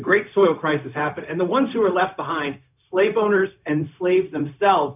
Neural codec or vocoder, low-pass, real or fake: codec, 16 kHz, 0.4 kbps, LongCat-Audio-Codec; 3.6 kHz; fake